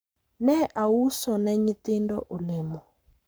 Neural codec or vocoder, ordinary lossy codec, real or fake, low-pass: codec, 44.1 kHz, 7.8 kbps, Pupu-Codec; none; fake; none